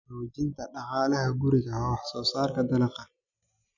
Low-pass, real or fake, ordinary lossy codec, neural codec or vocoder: 7.2 kHz; real; none; none